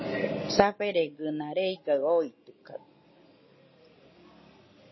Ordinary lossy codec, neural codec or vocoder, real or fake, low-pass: MP3, 24 kbps; none; real; 7.2 kHz